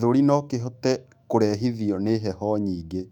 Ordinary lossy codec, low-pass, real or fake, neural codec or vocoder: none; 19.8 kHz; fake; autoencoder, 48 kHz, 128 numbers a frame, DAC-VAE, trained on Japanese speech